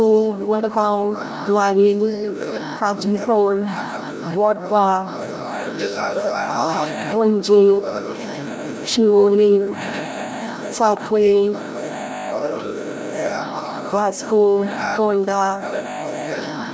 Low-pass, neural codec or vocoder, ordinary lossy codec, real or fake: none; codec, 16 kHz, 0.5 kbps, FreqCodec, larger model; none; fake